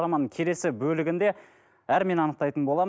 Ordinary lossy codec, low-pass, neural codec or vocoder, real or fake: none; none; none; real